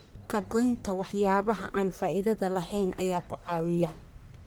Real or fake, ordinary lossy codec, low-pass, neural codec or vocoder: fake; none; none; codec, 44.1 kHz, 1.7 kbps, Pupu-Codec